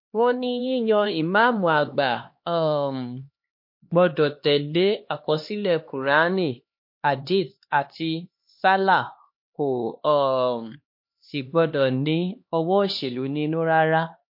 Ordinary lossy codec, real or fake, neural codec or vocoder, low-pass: MP3, 32 kbps; fake; codec, 16 kHz, 2 kbps, X-Codec, HuBERT features, trained on LibriSpeech; 5.4 kHz